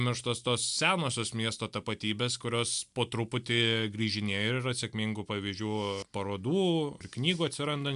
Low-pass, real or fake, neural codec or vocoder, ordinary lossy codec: 10.8 kHz; real; none; MP3, 96 kbps